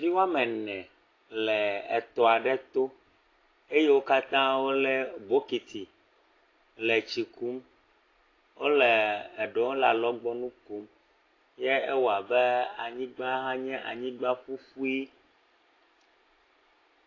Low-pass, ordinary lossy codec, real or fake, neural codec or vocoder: 7.2 kHz; AAC, 32 kbps; real; none